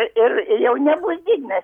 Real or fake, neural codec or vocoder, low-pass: real; none; 19.8 kHz